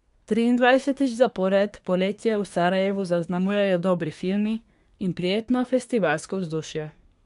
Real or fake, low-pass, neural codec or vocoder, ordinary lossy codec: fake; 10.8 kHz; codec, 24 kHz, 1 kbps, SNAC; MP3, 96 kbps